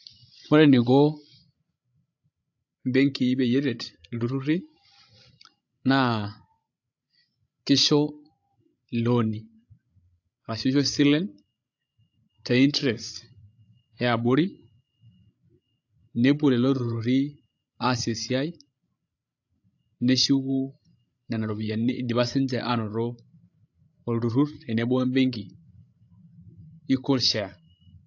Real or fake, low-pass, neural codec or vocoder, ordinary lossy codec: fake; 7.2 kHz; codec, 16 kHz, 16 kbps, FreqCodec, larger model; AAC, 48 kbps